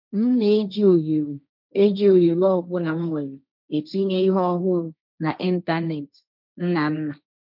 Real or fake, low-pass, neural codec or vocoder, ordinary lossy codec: fake; 5.4 kHz; codec, 16 kHz, 1.1 kbps, Voila-Tokenizer; none